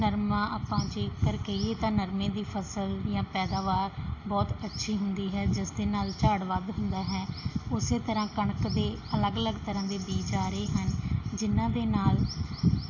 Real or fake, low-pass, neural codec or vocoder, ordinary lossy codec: real; 7.2 kHz; none; AAC, 48 kbps